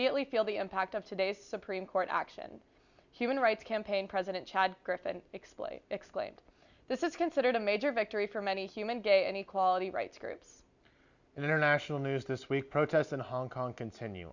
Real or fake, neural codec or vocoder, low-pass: real; none; 7.2 kHz